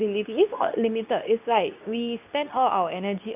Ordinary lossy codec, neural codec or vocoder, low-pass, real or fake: none; codec, 24 kHz, 0.9 kbps, WavTokenizer, medium speech release version 2; 3.6 kHz; fake